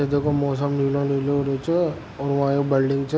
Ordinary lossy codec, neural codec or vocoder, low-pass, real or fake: none; none; none; real